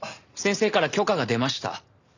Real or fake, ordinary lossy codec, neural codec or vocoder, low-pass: real; none; none; 7.2 kHz